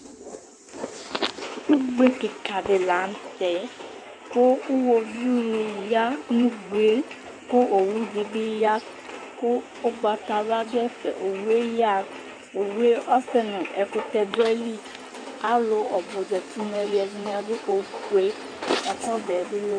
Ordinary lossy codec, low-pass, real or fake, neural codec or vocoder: AAC, 64 kbps; 9.9 kHz; fake; codec, 16 kHz in and 24 kHz out, 2.2 kbps, FireRedTTS-2 codec